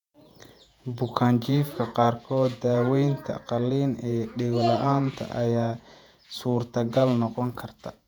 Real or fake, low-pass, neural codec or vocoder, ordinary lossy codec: fake; 19.8 kHz; vocoder, 48 kHz, 128 mel bands, Vocos; none